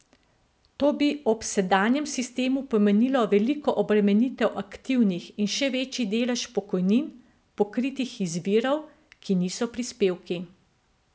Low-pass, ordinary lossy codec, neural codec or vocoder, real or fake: none; none; none; real